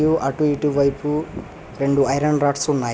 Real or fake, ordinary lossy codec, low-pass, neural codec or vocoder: real; none; none; none